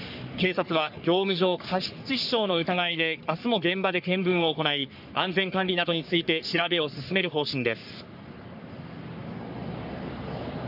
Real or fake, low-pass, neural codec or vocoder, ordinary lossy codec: fake; 5.4 kHz; codec, 44.1 kHz, 3.4 kbps, Pupu-Codec; none